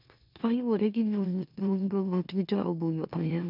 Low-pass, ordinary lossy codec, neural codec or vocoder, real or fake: 5.4 kHz; none; autoencoder, 44.1 kHz, a latent of 192 numbers a frame, MeloTTS; fake